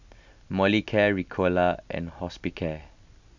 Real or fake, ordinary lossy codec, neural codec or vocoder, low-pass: real; none; none; 7.2 kHz